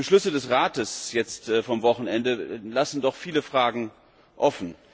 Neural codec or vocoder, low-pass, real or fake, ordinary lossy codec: none; none; real; none